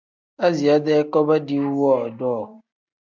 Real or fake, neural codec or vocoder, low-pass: real; none; 7.2 kHz